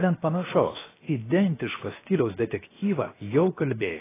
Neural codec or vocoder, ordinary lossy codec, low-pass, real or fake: codec, 16 kHz, 0.7 kbps, FocalCodec; AAC, 16 kbps; 3.6 kHz; fake